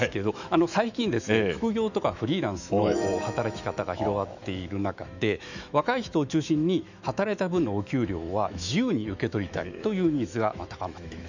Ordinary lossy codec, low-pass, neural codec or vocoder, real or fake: none; 7.2 kHz; autoencoder, 48 kHz, 128 numbers a frame, DAC-VAE, trained on Japanese speech; fake